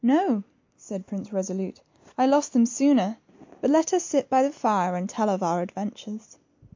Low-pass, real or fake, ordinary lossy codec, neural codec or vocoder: 7.2 kHz; real; MP3, 48 kbps; none